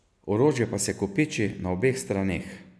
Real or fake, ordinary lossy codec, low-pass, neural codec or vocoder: real; none; none; none